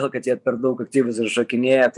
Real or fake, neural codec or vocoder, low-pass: fake; vocoder, 24 kHz, 100 mel bands, Vocos; 10.8 kHz